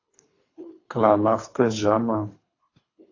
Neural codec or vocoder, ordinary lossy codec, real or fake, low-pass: codec, 24 kHz, 3 kbps, HILCodec; AAC, 32 kbps; fake; 7.2 kHz